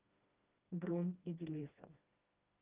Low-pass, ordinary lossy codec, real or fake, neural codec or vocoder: 3.6 kHz; Opus, 32 kbps; fake; codec, 16 kHz, 1 kbps, FreqCodec, smaller model